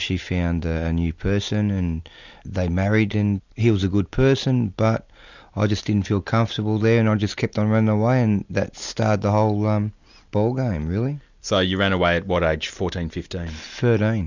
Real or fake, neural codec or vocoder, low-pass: real; none; 7.2 kHz